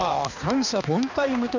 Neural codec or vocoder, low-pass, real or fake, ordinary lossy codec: codec, 16 kHz, 2 kbps, X-Codec, HuBERT features, trained on balanced general audio; 7.2 kHz; fake; none